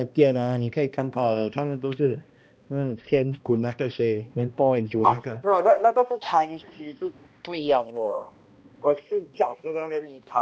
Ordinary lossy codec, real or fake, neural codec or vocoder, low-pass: none; fake; codec, 16 kHz, 1 kbps, X-Codec, HuBERT features, trained on balanced general audio; none